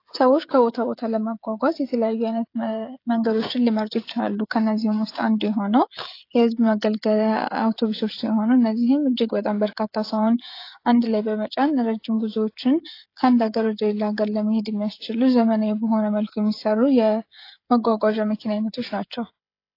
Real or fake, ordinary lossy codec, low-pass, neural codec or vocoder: fake; AAC, 32 kbps; 5.4 kHz; codec, 16 kHz, 16 kbps, FreqCodec, smaller model